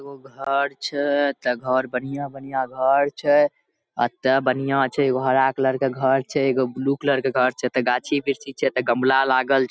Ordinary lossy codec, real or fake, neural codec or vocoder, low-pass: none; real; none; none